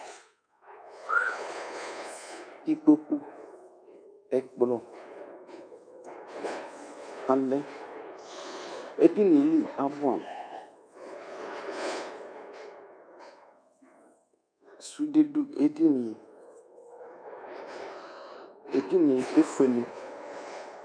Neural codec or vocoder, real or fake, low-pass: codec, 24 kHz, 1.2 kbps, DualCodec; fake; 9.9 kHz